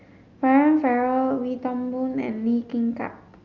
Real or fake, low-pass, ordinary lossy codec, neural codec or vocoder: real; 7.2 kHz; Opus, 24 kbps; none